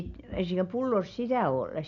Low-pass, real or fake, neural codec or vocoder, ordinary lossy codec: 7.2 kHz; real; none; none